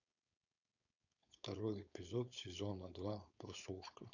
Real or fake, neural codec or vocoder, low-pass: fake; codec, 16 kHz, 4.8 kbps, FACodec; 7.2 kHz